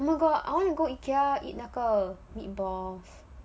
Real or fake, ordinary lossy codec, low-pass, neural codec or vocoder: real; none; none; none